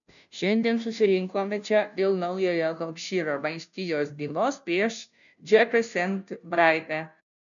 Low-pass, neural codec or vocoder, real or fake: 7.2 kHz; codec, 16 kHz, 0.5 kbps, FunCodec, trained on Chinese and English, 25 frames a second; fake